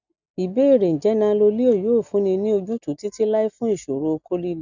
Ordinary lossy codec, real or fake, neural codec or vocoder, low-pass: none; real; none; 7.2 kHz